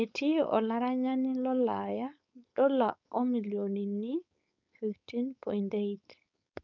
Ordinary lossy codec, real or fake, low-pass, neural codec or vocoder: none; fake; 7.2 kHz; codec, 16 kHz, 4.8 kbps, FACodec